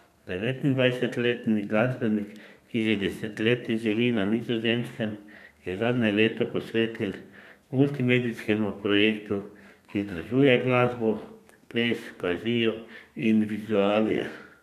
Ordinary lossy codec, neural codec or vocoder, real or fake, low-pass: none; codec, 32 kHz, 1.9 kbps, SNAC; fake; 14.4 kHz